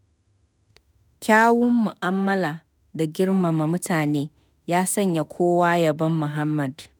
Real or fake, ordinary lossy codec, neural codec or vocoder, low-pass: fake; none; autoencoder, 48 kHz, 32 numbers a frame, DAC-VAE, trained on Japanese speech; none